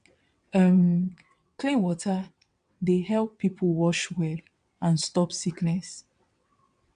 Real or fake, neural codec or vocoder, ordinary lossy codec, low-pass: fake; vocoder, 22.05 kHz, 80 mel bands, WaveNeXt; AAC, 96 kbps; 9.9 kHz